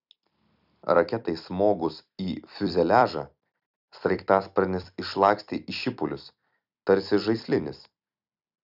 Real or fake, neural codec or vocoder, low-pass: fake; vocoder, 44.1 kHz, 128 mel bands every 256 samples, BigVGAN v2; 5.4 kHz